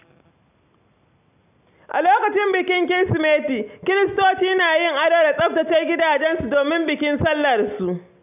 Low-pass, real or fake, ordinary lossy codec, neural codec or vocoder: 3.6 kHz; real; none; none